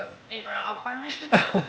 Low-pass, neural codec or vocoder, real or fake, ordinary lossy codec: none; codec, 16 kHz, 0.8 kbps, ZipCodec; fake; none